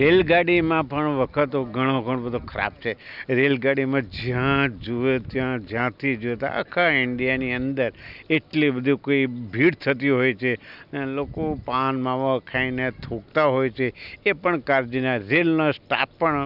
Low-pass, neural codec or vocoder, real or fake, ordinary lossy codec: 5.4 kHz; none; real; none